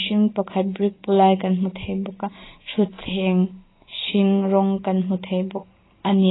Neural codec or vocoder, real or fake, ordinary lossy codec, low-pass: none; real; AAC, 16 kbps; 7.2 kHz